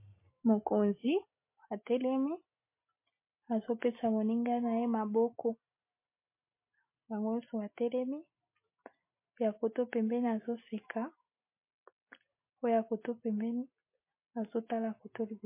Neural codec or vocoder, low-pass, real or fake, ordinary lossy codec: none; 3.6 kHz; real; MP3, 24 kbps